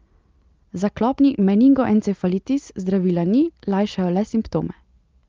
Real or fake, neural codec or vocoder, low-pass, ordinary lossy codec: real; none; 7.2 kHz; Opus, 32 kbps